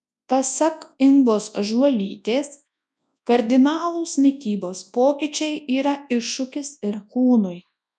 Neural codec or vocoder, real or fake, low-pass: codec, 24 kHz, 0.9 kbps, WavTokenizer, large speech release; fake; 10.8 kHz